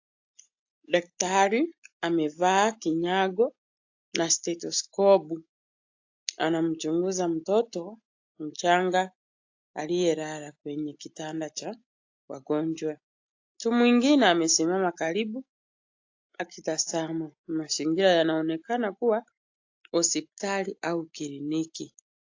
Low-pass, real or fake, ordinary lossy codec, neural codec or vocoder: 7.2 kHz; real; AAC, 48 kbps; none